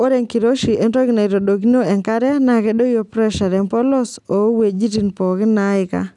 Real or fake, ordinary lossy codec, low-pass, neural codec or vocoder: real; none; 10.8 kHz; none